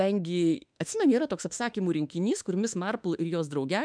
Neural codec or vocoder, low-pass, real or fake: autoencoder, 48 kHz, 32 numbers a frame, DAC-VAE, trained on Japanese speech; 9.9 kHz; fake